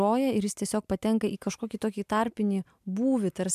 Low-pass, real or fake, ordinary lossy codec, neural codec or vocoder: 14.4 kHz; real; MP3, 96 kbps; none